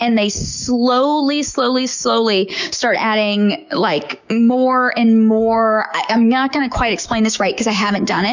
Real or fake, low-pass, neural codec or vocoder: fake; 7.2 kHz; codec, 16 kHz, 6 kbps, DAC